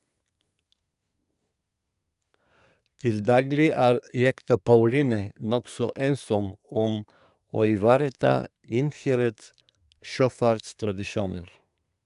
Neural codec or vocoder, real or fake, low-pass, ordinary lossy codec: codec, 24 kHz, 1 kbps, SNAC; fake; 10.8 kHz; none